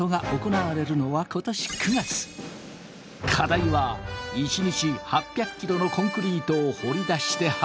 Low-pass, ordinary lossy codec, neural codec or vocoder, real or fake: none; none; none; real